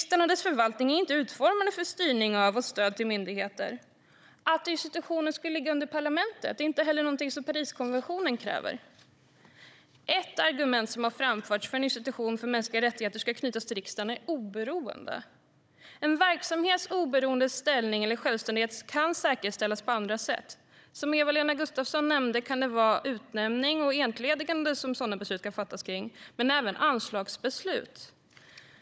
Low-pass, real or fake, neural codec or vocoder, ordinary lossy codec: none; fake; codec, 16 kHz, 16 kbps, FunCodec, trained on Chinese and English, 50 frames a second; none